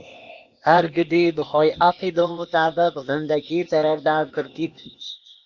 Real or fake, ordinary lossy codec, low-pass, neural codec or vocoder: fake; Opus, 64 kbps; 7.2 kHz; codec, 16 kHz, 0.8 kbps, ZipCodec